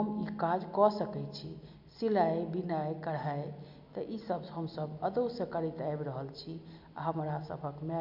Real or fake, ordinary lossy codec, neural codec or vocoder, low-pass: real; MP3, 48 kbps; none; 5.4 kHz